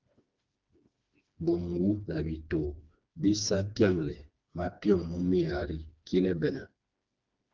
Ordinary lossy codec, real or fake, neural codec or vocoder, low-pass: Opus, 24 kbps; fake; codec, 16 kHz, 2 kbps, FreqCodec, smaller model; 7.2 kHz